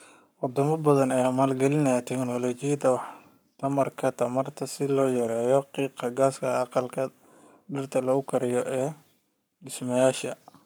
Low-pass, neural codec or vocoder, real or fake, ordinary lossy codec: none; codec, 44.1 kHz, 7.8 kbps, Pupu-Codec; fake; none